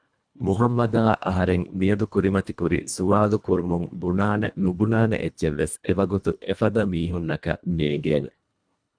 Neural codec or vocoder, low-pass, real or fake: codec, 24 kHz, 1.5 kbps, HILCodec; 9.9 kHz; fake